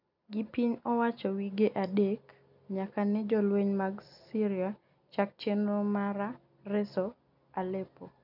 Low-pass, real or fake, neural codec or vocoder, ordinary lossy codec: 5.4 kHz; real; none; none